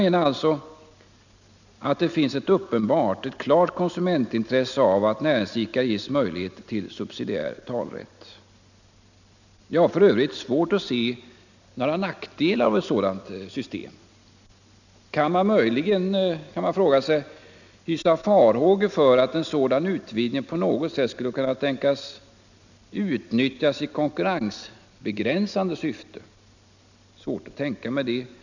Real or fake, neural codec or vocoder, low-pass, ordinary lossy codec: real; none; 7.2 kHz; none